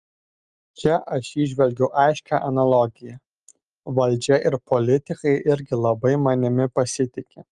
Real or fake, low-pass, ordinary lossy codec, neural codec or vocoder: real; 10.8 kHz; Opus, 32 kbps; none